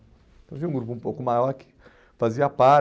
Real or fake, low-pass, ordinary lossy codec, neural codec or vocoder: real; none; none; none